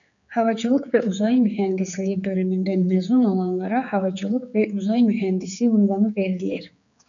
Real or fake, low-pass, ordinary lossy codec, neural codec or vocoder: fake; 7.2 kHz; MP3, 96 kbps; codec, 16 kHz, 4 kbps, X-Codec, HuBERT features, trained on general audio